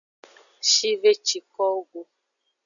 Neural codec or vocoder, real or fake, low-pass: none; real; 7.2 kHz